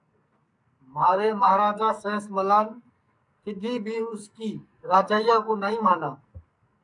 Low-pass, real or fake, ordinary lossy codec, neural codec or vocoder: 10.8 kHz; fake; MP3, 96 kbps; codec, 44.1 kHz, 2.6 kbps, SNAC